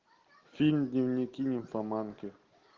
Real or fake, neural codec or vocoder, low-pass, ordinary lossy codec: real; none; 7.2 kHz; Opus, 16 kbps